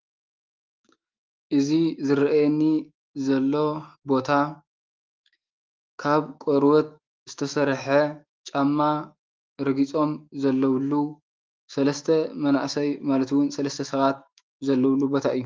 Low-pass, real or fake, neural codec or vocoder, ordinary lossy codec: 7.2 kHz; fake; autoencoder, 48 kHz, 128 numbers a frame, DAC-VAE, trained on Japanese speech; Opus, 32 kbps